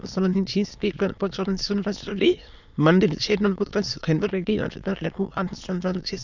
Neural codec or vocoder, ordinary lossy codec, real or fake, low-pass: autoencoder, 22.05 kHz, a latent of 192 numbers a frame, VITS, trained on many speakers; none; fake; 7.2 kHz